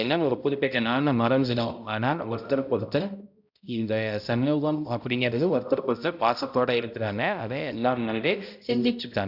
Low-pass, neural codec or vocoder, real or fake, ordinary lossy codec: 5.4 kHz; codec, 16 kHz, 0.5 kbps, X-Codec, HuBERT features, trained on balanced general audio; fake; none